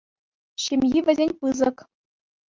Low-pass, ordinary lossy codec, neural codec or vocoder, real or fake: 7.2 kHz; Opus, 24 kbps; none; real